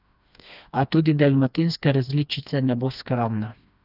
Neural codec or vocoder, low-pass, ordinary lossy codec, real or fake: codec, 16 kHz, 2 kbps, FreqCodec, smaller model; 5.4 kHz; none; fake